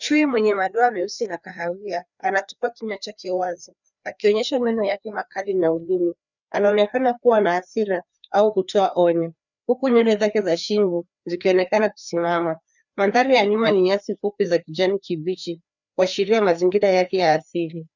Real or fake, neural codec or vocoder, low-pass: fake; codec, 16 kHz, 2 kbps, FreqCodec, larger model; 7.2 kHz